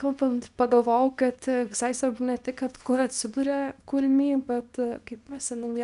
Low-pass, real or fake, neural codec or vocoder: 10.8 kHz; fake; codec, 24 kHz, 0.9 kbps, WavTokenizer, small release